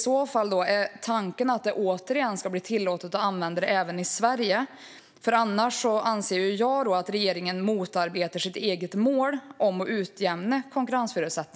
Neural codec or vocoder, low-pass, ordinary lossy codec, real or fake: none; none; none; real